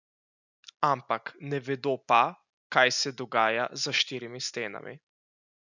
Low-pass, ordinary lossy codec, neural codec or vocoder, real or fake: 7.2 kHz; none; none; real